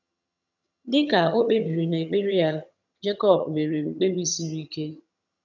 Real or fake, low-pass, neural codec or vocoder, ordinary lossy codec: fake; 7.2 kHz; vocoder, 22.05 kHz, 80 mel bands, HiFi-GAN; none